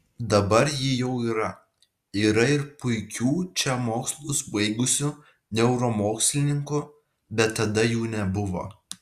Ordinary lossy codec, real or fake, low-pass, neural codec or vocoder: Opus, 64 kbps; real; 14.4 kHz; none